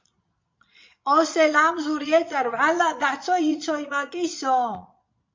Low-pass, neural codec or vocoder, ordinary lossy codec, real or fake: 7.2 kHz; vocoder, 22.05 kHz, 80 mel bands, Vocos; MP3, 48 kbps; fake